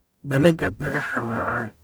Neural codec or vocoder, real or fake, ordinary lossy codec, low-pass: codec, 44.1 kHz, 0.9 kbps, DAC; fake; none; none